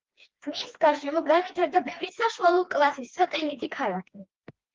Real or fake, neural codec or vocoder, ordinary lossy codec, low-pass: fake; codec, 16 kHz, 2 kbps, FreqCodec, smaller model; Opus, 32 kbps; 7.2 kHz